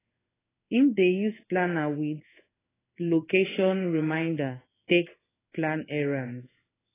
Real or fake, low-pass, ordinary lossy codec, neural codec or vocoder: fake; 3.6 kHz; AAC, 16 kbps; codec, 16 kHz in and 24 kHz out, 1 kbps, XY-Tokenizer